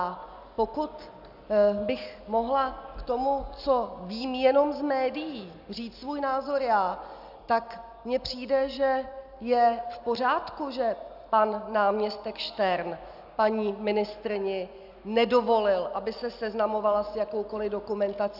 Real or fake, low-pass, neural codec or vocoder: real; 5.4 kHz; none